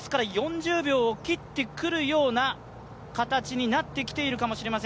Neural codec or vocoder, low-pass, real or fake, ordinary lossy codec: none; none; real; none